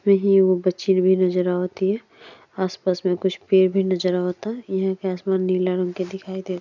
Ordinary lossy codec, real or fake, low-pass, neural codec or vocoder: none; real; 7.2 kHz; none